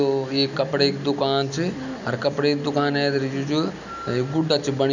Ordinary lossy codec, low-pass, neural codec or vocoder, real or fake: none; 7.2 kHz; none; real